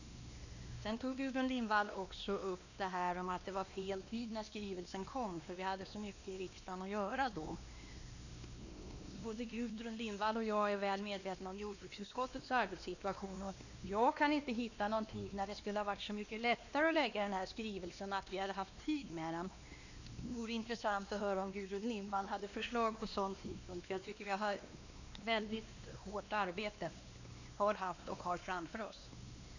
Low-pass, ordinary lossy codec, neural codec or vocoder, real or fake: 7.2 kHz; none; codec, 16 kHz, 2 kbps, X-Codec, WavLM features, trained on Multilingual LibriSpeech; fake